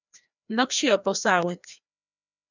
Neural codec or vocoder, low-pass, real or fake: codec, 16 kHz, 1 kbps, FreqCodec, larger model; 7.2 kHz; fake